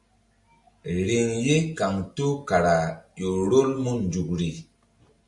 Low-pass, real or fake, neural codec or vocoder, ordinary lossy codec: 10.8 kHz; real; none; MP3, 64 kbps